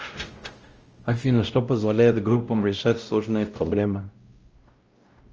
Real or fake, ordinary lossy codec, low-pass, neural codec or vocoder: fake; Opus, 24 kbps; 7.2 kHz; codec, 16 kHz, 0.5 kbps, X-Codec, WavLM features, trained on Multilingual LibriSpeech